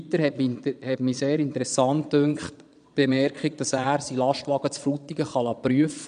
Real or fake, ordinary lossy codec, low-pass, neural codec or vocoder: fake; none; 9.9 kHz; vocoder, 22.05 kHz, 80 mel bands, Vocos